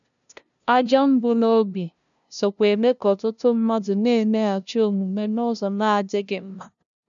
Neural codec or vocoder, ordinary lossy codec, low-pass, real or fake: codec, 16 kHz, 0.5 kbps, FunCodec, trained on LibriTTS, 25 frames a second; none; 7.2 kHz; fake